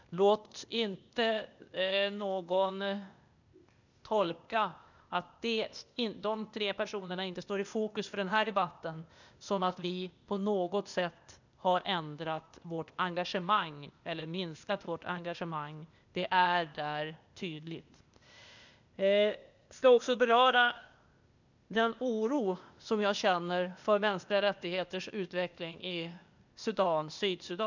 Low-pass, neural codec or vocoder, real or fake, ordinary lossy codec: 7.2 kHz; codec, 16 kHz, 0.8 kbps, ZipCodec; fake; none